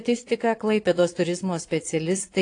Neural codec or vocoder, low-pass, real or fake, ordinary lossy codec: vocoder, 22.05 kHz, 80 mel bands, Vocos; 9.9 kHz; fake; AAC, 48 kbps